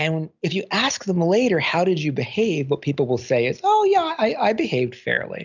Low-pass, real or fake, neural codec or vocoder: 7.2 kHz; real; none